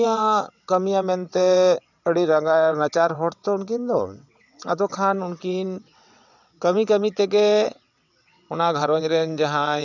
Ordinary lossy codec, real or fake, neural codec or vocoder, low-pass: none; fake; vocoder, 22.05 kHz, 80 mel bands, WaveNeXt; 7.2 kHz